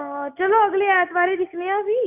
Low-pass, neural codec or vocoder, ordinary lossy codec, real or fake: 3.6 kHz; none; none; real